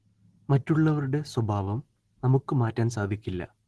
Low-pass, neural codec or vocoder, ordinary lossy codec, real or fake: 10.8 kHz; none; Opus, 16 kbps; real